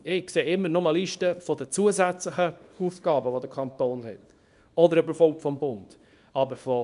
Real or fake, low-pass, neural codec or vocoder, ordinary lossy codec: fake; 10.8 kHz; codec, 24 kHz, 0.9 kbps, WavTokenizer, small release; none